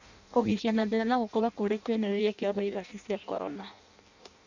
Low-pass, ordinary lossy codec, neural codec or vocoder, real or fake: 7.2 kHz; none; codec, 16 kHz in and 24 kHz out, 0.6 kbps, FireRedTTS-2 codec; fake